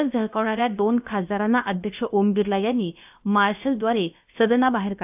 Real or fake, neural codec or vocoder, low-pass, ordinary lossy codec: fake; codec, 16 kHz, about 1 kbps, DyCAST, with the encoder's durations; 3.6 kHz; AAC, 32 kbps